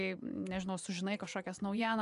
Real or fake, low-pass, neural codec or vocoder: fake; 10.8 kHz; vocoder, 24 kHz, 100 mel bands, Vocos